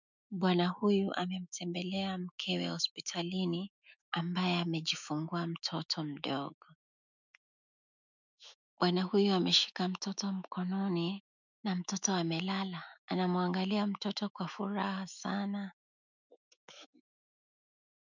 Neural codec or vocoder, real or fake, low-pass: autoencoder, 48 kHz, 128 numbers a frame, DAC-VAE, trained on Japanese speech; fake; 7.2 kHz